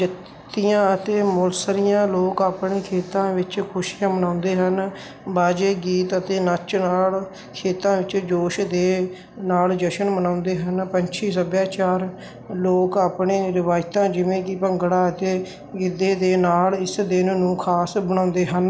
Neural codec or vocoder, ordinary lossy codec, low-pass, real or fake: none; none; none; real